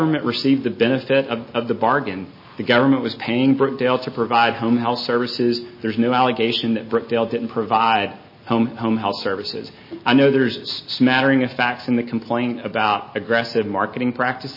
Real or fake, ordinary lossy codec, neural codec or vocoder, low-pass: real; MP3, 24 kbps; none; 5.4 kHz